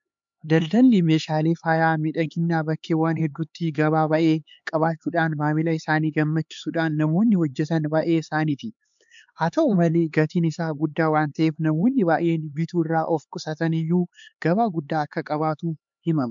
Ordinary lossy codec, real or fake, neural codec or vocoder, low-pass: MP3, 64 kbps; fake; codec, 16 kHz, 4 kbps, X-Codec, HuBERT features, trained on LibriSpeech; 7.2 kHz